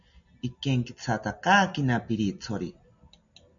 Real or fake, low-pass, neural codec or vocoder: real; 7.2 kHz; none